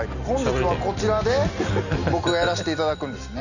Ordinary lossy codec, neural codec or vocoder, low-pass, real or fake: none; none; 7.2 kHz; real